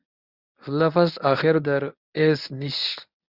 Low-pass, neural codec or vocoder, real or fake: 5.4 kHz; codec, 24 kHz, 0.9 kbps, WavTokenizer, medium speech release version 1; fake